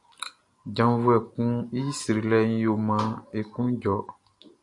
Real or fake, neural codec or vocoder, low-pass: real; none; 10.8 kHz